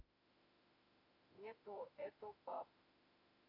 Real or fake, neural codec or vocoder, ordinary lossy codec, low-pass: fake; autoencoder, 48 kHz, 32 numbers a frame, DAC-VAE, trained on Japanese speech; AAC, 48 kbps; 5.4 kHz